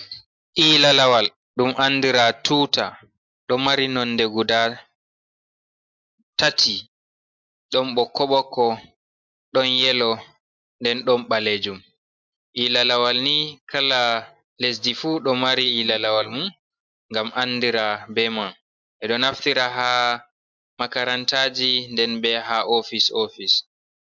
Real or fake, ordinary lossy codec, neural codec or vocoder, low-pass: real; MP3, 64 kbps; none; 7.2 kHz